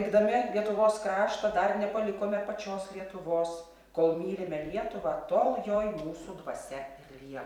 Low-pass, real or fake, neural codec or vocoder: 19.8 kHz; fake; vocoder, 44.1 kHz, 128 mel bands every 256 samples, BigVGAN v2